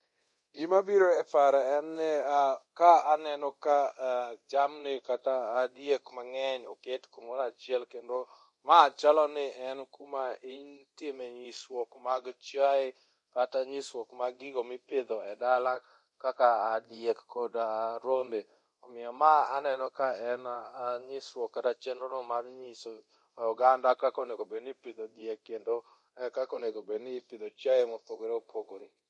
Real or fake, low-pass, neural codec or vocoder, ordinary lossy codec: fake; 10.8 kHz; codec, 24 kHz, 0.9 kbps, DualCodec; MP3, 48 kbps